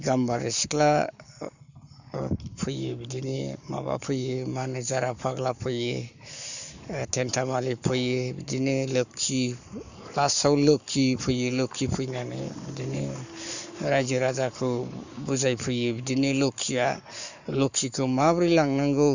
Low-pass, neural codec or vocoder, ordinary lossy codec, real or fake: 7.2 kHz; codec, 44.1 kHz, 7.8 kbps, Pupu-Codec; none; fake